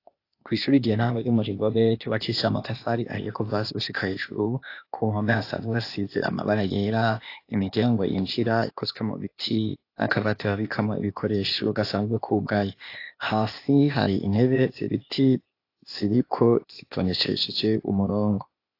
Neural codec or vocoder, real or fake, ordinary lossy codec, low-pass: codec, 16 kHz, 0.8 kbps, ZipCodec; fake; AAC, 32 kbps; 5.4 kHz